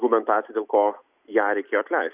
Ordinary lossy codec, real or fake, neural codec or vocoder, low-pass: Opus, 64 kbps; real; none; 3.6 kHz